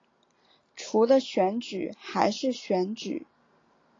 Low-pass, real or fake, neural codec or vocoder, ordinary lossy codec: 7.2 kHz; real; none; AAC, 32 kbps